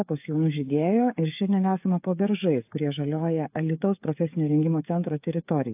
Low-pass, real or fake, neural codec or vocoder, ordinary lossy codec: 3.6 kHz; fake; codec, 16 kHz, 8 kbps, FreqCodec, smaller model; AAC, 32 kbps